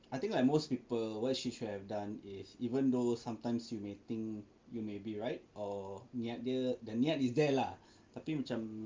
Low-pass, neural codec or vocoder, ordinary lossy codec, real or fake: 7.2 kHz; none; Opus, 32 kbps; real